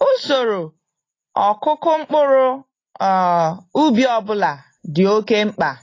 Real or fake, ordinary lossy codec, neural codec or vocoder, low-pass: real; AAC, 32 kbps; none; 7.2 kHz